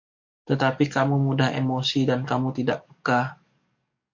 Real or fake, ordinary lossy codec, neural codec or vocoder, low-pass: fake; MP3, 64 kbps; codec, 44.1 kHz, 7.8 kbps, DAC; 7.2 kHz